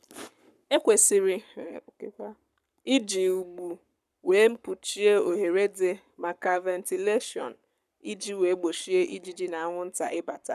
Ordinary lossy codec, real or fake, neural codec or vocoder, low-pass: none; fake; codec, 44.1 kHz, 7.8 kbps, Pupu-Codec; 14.4 kHz